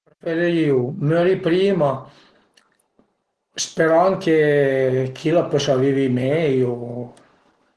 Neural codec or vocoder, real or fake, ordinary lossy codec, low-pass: none; real; Opus, 16 kbps; 10.8 kHz